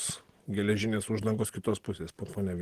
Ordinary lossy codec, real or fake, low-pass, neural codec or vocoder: Opus, 16 kbps; real; 14.4 kHz; none